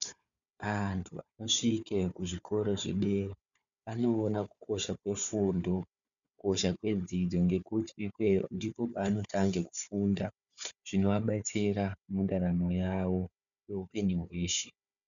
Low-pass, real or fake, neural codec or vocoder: 7.2 kHz; fake; codec, 16 kHz, 4 kbps, FunCodec, trained on Chinese and English, 50 frames a second